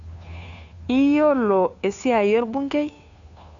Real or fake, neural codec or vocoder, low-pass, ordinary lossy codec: fake; codec, 16 kHz, 2 kbps, FunCodec, trained on Chinese and English, 25 frames a second; 7.2 kHz; none